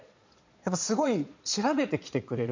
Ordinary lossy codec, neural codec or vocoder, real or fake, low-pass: MP3, 64 kbps; vocoder, 22.05 kHz, 80 mel bands, Vocos; fake; 7.2 kHz